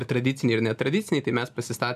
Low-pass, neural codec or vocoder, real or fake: 14.4 kHz; none; real